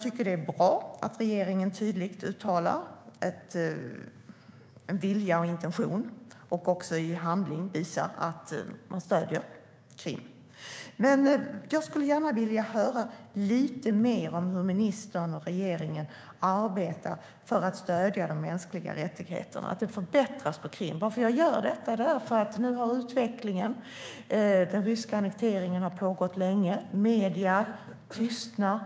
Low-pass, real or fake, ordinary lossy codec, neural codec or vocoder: none; fake; none; codec, 16 kHz, 6 kbps, DAC